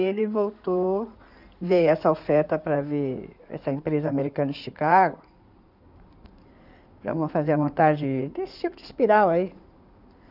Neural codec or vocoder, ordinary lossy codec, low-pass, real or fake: codec, 16 kHz in and 24 kHz out, 2.2 kbps, FireRedTTS-2 codec; none; 5.4 kHz; fake